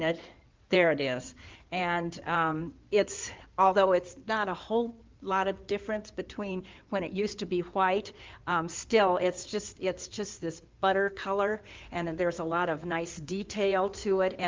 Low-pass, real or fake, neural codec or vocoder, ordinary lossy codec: 7.2 kHz; fake; codec, 16 kHz in and 24 kHz out, 2.2 kbps, FireRedTTS-2 codec; Opus, 24 kbps